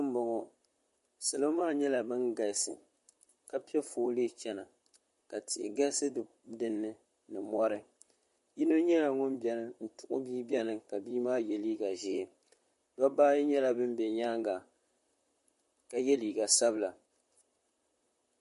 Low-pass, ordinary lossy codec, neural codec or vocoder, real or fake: 14.4 kHz; MP3, 48 kbps; vocoder, 44.1 kHz, 128 mel bands, Pupu-Vocoder; fake